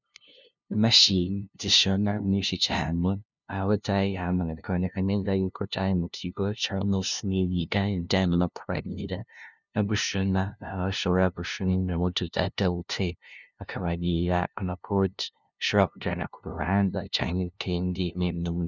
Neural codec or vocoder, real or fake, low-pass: codec, 16 kHz, 0.5 kbps, FunCodec, trained on LibriTTS, 25 frames a second; fake; 7.2 kHz